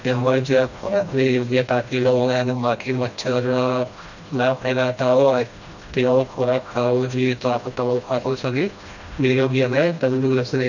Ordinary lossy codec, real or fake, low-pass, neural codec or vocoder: none; fake; 7.2 kHz; codec, 16 kHz, 1 kbps, FreqCodec, smaller model